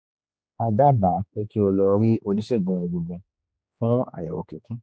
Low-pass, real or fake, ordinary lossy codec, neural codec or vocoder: none; fake; none; codec, 16 kHz, 2 kbps, X-Codec, HuBERT features, trained on general audio